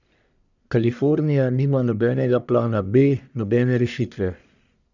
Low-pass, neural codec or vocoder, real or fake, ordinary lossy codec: 7.2 kHz; codec, 44.1 kHz, 1.7 kbps, Pupu-Codec; fake; none